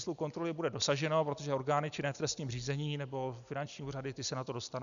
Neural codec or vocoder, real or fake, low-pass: none; real; 7.2 kHz